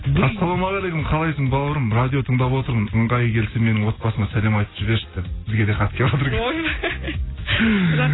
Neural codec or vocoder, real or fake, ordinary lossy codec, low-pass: none; real; AAC, 16 kbps; 7.2 kHz